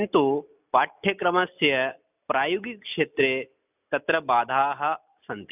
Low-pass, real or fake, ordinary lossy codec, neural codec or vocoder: 3.6 kHz; real; none; none